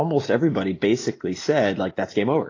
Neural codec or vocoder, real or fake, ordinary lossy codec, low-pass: none; real; AAC, 32 kbps; 7.2 kHz